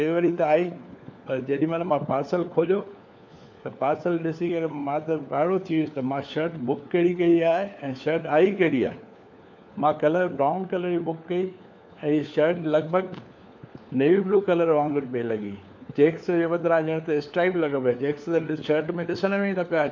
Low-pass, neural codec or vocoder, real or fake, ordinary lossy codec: none; codec, 16 kHz, 4 kbps, FunCodec, trained on LibriTTS, 50 frames a second; fake; none